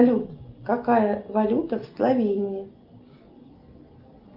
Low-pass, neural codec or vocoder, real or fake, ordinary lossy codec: 5.4 kHz; none; real; Opus, 32 kbps